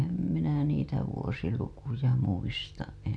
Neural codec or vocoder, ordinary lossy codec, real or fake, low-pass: none; none; real; none